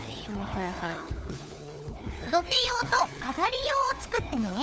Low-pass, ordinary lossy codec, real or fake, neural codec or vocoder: none; none; fake; codec, 16 kHz, 4 kbps, FunCodec, trained on LibriTTS, 50 frames a second